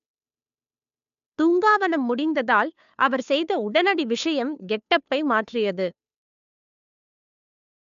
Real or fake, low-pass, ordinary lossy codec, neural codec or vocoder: fake; 7.2 kHz; AAC, 96 kbps; codec, 16 kHz, 2 kbps, FunCodec, trained on Chinese and English, 25 frames a second